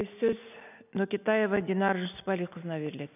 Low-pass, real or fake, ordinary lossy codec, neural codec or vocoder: 3.6 kHz; real; none; none